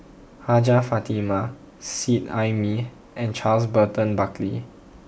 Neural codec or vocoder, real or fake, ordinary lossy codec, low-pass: none; real; none; none